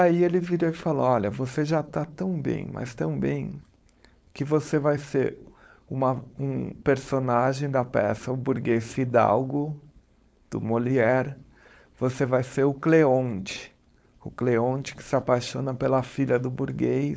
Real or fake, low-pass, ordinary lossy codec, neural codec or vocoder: fake; none; none; codec, 16 kHz, 4.8 kbps, FACodec